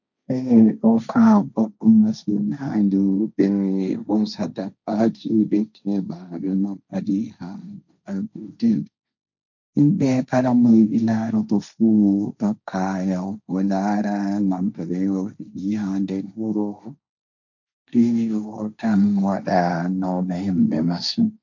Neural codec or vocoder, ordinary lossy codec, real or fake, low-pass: codec, 16 kHz, 1.1 kbps, Voila-Tokenizer; none; fake; 7.2 kHz